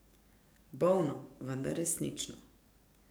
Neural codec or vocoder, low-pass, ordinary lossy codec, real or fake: codec, 44.1 kHz, 7.8 kbps, DAC; none; none; fake